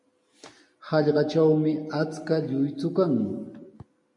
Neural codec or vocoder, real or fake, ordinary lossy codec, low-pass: none; real; MP3, 48 kbps; 10.8 kHz